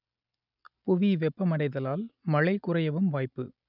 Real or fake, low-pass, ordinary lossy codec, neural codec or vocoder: real; 5.4 kHz; none; none